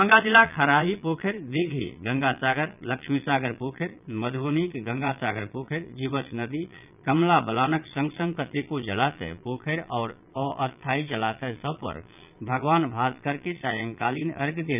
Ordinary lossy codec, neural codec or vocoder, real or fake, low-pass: none; vocoder, 22.05 kHz, 80 mel bands, Vocos; fake; 3.6 kHz